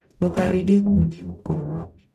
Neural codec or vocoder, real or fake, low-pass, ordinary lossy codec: codec, 44.1 kHz, 0.9 kbps, DAC; fake; 14.4 kHz; none